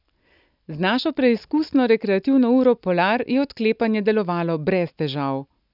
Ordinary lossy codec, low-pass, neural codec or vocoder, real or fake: none; 5.4 kHz; none; real